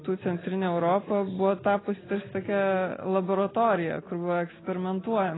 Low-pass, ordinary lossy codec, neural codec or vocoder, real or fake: 7.2 kHz; AAC, 16 kbps; none; real